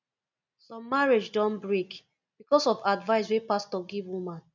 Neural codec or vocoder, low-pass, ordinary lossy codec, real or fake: none; 7.2 kHz; none; real